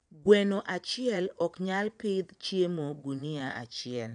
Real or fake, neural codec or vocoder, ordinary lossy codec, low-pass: fake; vocoder, 22.05 kHz, 80 mel bands, Vocos; none; 9.9 kHz